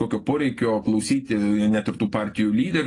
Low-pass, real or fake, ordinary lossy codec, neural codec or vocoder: 10.8 kHz; real; AAC, 32 kbps; none